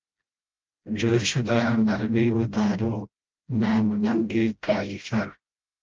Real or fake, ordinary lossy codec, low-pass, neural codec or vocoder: fake; Opus, 24 kbps; 7.2 kHz; codec, 16 kHz, 0.5 kbps, FreqCodec, smaller model